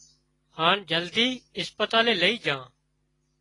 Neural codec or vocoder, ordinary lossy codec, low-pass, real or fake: none; AAC, 32 kbps; 10.8 kHz; real